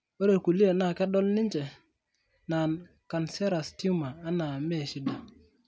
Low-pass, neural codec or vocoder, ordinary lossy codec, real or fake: none; none; none; real